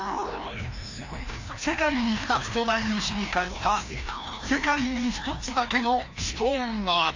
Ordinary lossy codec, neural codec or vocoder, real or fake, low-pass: AAC, 48 kbps; codec, 16 kHz, 1 kbps, FreqCodec, larger model; fake; 7.2 kHz